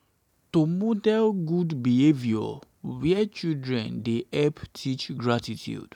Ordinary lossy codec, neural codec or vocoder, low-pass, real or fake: none; none; 19.8 kHz; real